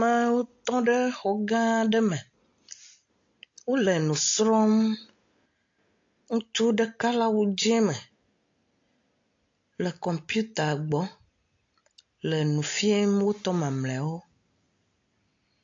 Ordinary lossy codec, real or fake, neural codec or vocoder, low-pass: AAC, 48 kbps; real; none; 7.2 kHz